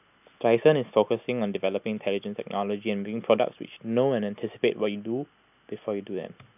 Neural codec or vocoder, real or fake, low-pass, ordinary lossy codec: none; real; 3.6 kHz; none